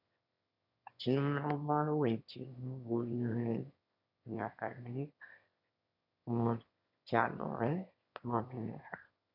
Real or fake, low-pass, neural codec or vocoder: fake; 5.4 kHz; autoencoder, 22.05 kHz, a latent of 192 numbers a frame, VITS, trained on one speaker